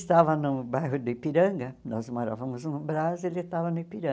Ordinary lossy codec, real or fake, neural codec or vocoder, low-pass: none; real; none; none